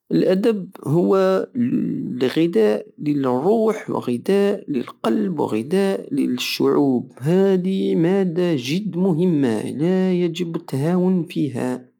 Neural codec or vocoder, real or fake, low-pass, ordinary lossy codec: none; real; 19.8 kHz; none